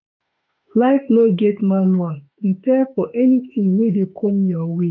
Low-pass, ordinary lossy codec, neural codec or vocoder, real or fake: 7.2 kHz; none; autoencoder, 48 kHz, 32 numbers a frame, DAC-VAE, trained on Japanese speech; fake